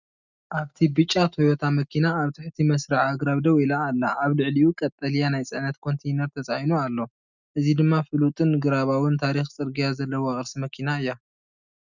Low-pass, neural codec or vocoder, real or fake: 7.2 kHz; none; real